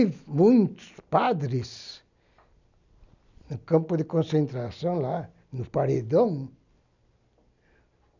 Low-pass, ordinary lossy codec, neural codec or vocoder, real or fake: 7.2 kHz; none; none; real